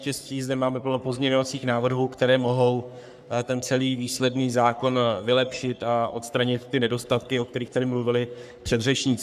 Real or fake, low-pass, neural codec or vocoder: fake; 14.4 kHz; codec, 44.1 kHz, 3.4 kbps, Pupu-Codec